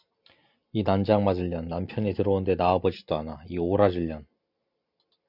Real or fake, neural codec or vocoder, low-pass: real; none; 5.4 kHz